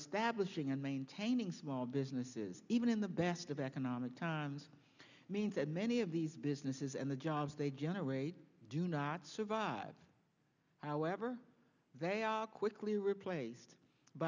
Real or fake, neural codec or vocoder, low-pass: real; none; 7.2 kHz